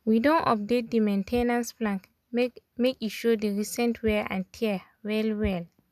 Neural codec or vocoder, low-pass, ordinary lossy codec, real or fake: none; 14.4 kHz; none; real